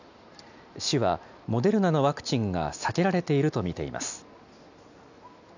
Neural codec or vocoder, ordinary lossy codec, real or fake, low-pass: none; none; real; 7.2 kHz